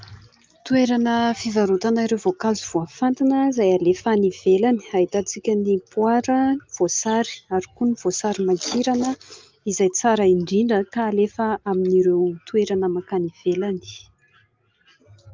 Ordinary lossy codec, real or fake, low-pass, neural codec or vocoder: Opus, 24 kbps; real; 7.2 kHz; none